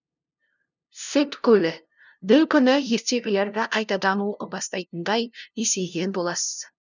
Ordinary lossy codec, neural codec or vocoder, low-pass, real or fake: none; codec, 16 kHz, 0.5 kbps, FunCodec, trained on LibriTTS, 25 frames a second; 7.2 kHz; fake